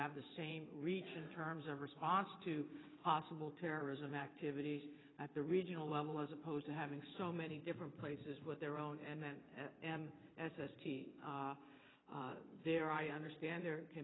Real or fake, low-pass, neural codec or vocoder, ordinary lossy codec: fake; 7.2 kHz; vocoder, 22.05 kHz, 80 mel bands, WaveNeXt; AAC, 16 kbps